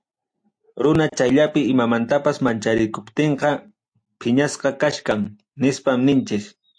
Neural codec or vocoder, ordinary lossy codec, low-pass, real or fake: none; AAC, 64 kbps; 9.9 kHz; real